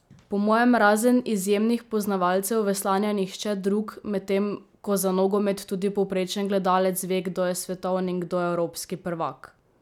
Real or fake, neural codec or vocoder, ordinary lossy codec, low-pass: real; none; none; 19.8 kHz